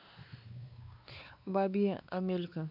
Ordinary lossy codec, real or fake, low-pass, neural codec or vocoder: none; fake; 5.4 kHz; codec, 16 kHz, 2 kbps, X-Codec, WavLM features, trained on Multilingual LibriSpeech